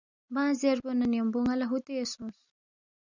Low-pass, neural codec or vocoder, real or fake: 7.2 kHz; none; real